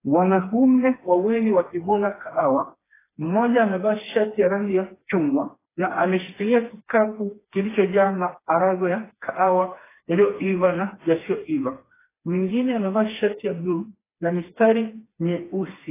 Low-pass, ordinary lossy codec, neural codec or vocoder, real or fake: 3.6 kHz; AAC, 16 kbps; codec, 16 kHz, 2 kbps, FreqCodec, smaller model; fake